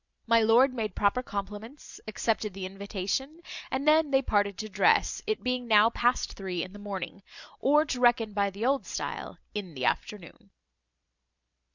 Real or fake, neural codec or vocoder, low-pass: real; none; 7.2 kHz